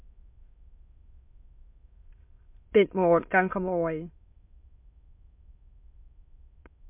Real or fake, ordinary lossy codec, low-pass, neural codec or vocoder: fake; MP3, 32 kbps; 3.6 kHz; autoencoder, 22.05 kHz, a latent of 192 numbers a frame, VITS, trained on many speakers